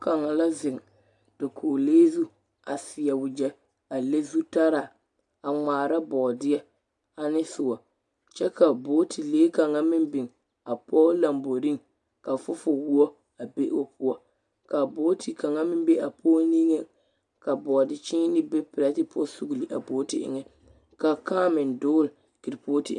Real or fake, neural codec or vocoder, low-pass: real; none; 10.8 kHz